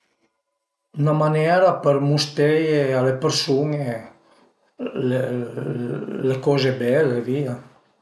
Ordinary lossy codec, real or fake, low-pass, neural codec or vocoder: none; real; none; none